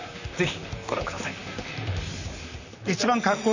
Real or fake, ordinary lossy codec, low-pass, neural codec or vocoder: fake; none; 7.2 kHz; vocoder, 44.1 kHz, 128 mel bands, Pupu-Vocoder